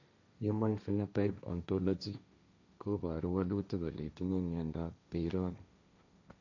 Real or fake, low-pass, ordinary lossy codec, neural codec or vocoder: fake; 7.2 kHz; none; codec, 16 kHz, 1.1 kbps, Voila-Tokenizer